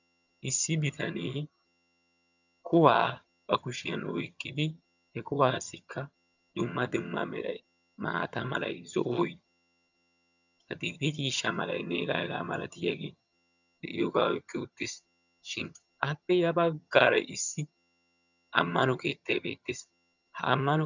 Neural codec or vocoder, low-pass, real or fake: vocoder, 22.05 kHz, 80 mel bands, HiFi-GAN; 7.2 kHz; fake